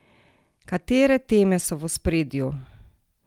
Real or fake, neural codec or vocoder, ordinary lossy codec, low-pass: real; none; Opus, 32 kbps; 19.8 kHz